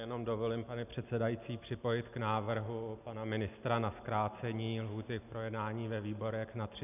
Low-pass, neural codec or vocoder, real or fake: 3.6 kHz; none; real